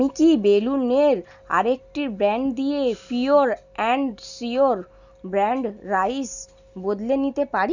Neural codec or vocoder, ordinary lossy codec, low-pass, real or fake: none; none; 7.2 kHz; real